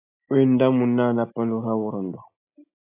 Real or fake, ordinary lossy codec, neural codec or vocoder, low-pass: real; AAC, 24 kbps; none; 3.6 kHz